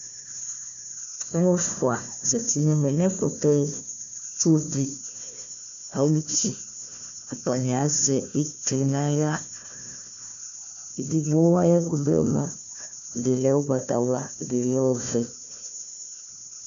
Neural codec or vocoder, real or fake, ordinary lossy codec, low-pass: codec, 16 kHz, 1 kbps, FunCodec, trained on Chinese and English, 50 frames a second; fake; MP3, 64 kbps; 7.2 kHz